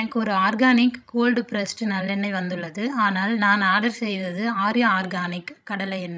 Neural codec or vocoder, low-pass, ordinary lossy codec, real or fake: codec, 16 kHz, 16 kbps, FreqCodec, larger model; none; none; fake